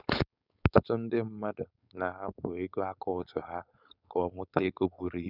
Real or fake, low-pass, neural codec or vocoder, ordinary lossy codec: fake; 5.4 kHz; codec, 16 kHz, 4.8 kbps, FACodec; none